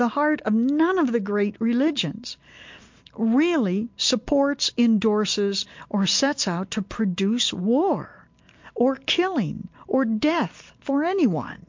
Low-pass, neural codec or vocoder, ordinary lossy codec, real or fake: 7.2 kHz; none; MP3, 48 kbps; real